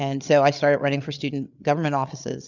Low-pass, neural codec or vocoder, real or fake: 7.2 kHz; codec, 16 kHz, 8 kbps, FreqCodec, larger model; fake